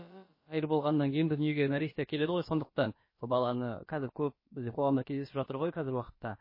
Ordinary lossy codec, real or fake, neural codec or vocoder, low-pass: MP3, 24 kbps; fake; codec, 16 kHz, about 1 kbps, DyCAST, with the encoder's durations; 5.4 kHz